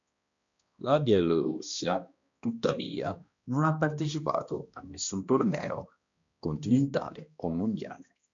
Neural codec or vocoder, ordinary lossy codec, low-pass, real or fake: codec, 16 kHz, 1 kbps, X-Codec, HuBERT features, trained on balanced general audio; MP3, 64 kbps; 7.2 kHz; fake